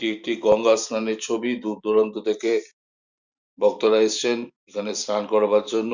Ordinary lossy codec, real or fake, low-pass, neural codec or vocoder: Opus, 64 kbps; fake; 7.2 kHz; vocoder, 44.1 kHz, 128 mel bands every 512 samples, BigVGAN v2